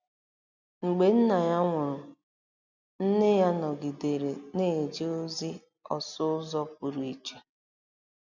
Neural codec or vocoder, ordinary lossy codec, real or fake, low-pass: none; none; real; 7.2 kHz